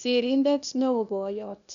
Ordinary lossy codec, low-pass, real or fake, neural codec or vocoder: none; 7.2 kHz; fake; codec, 16 kHz, 0.8 kbps, ZipCodec